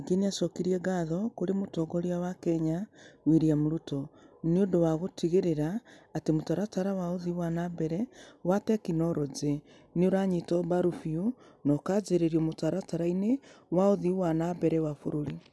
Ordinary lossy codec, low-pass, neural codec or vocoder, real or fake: none; none; none; real